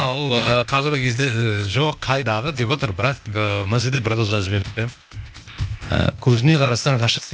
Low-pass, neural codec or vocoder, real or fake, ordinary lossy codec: none; codec, 16 kHz, 0.8 kbps, ZipCodec; fake; none